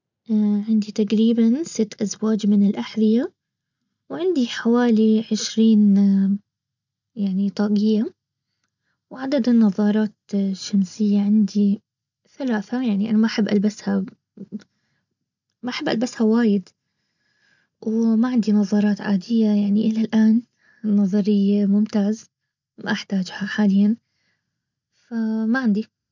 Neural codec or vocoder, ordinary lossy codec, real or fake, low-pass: none; none; real; 7.2 kHz